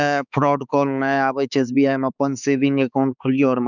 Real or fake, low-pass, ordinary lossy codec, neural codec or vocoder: fake; 7.2 kHz; none; codec, 16 kHz, 4 kbps, X-Codec, HuBERT features, trained on balanced general audio